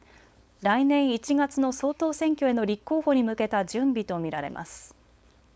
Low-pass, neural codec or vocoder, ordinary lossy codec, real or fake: none; codec, 16 kHz, 4.8 kbps, FACodec; none; fake